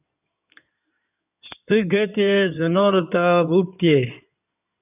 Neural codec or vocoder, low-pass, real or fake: codec, 16 kHz in and 24 kHz out, 2.2 kbps, FireRedTTS-2 codec; 3.6 kHz; fake